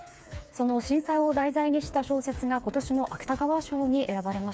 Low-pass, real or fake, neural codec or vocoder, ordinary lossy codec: none; fake; codec, 16 kHz, 4 kbps, FreqCodec, smaller model; none